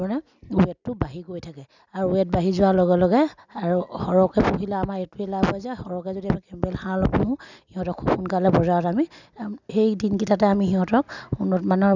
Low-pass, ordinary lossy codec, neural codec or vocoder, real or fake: 7.2 kHz; none; none; real